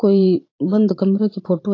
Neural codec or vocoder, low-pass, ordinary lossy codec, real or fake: vocoder, 44.1 kHz, 80 mel bands, Vocos; 7.2 kHz; AAC, 32 kbps; fake